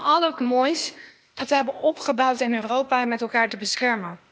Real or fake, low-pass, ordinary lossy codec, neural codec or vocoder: fake; none; none; codec, 16 kHz, 0.8 kbps, ZipCodec